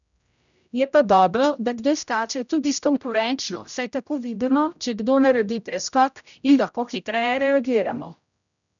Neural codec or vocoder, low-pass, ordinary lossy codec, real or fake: codec, 16 kHz, 0.5 kbps, X-Codec, HuBERT features, trained on general audio; 7.2 kHz; none; fake